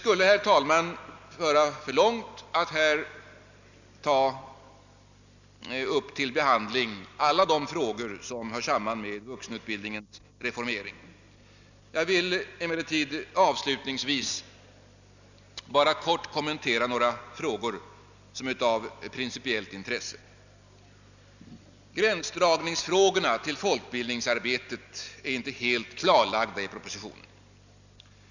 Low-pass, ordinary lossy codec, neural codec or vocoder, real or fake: 7.2 kHz; none; none; real